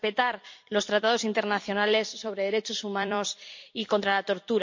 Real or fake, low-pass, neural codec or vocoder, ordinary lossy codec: fake; 7.2 kHz; vocoder, 44.1 kHz, 128 mel bands every 256 samples, BigVGAN v2; MP3, 48 kbps